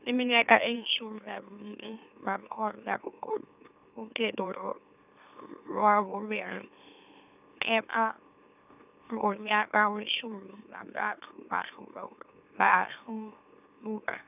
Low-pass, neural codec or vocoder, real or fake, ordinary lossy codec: 3.6 kHz; autoencoder, 44.1 kHz, a latent of 192 numbers a frame, MeloTTS; fake; none